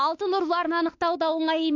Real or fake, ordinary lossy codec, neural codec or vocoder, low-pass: fake; AAC, 48 kbps; autoencoder, 48 kHz, 32 numbers a frame, DAC-VAE, trained on Japanese speech; 7.2 kHz